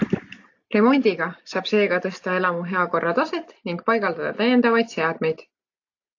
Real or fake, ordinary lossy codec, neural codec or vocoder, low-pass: real; AAC, 48 kbps; none; 7.2 kHz